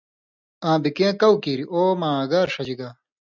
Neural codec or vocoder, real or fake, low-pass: none; real; 7.2 kHz